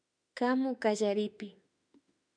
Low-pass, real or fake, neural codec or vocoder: 9.9 kHz; fake; autoencoder, 48 kHz, 32 numbers a frame, DAC-VAE, trained on Japanese speech